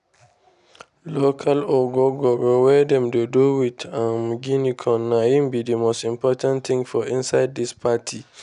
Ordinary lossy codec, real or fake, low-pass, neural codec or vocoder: none; real; 10.8 kHz; none